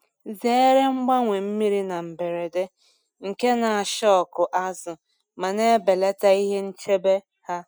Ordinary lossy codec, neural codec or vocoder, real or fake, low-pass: none; none; real; none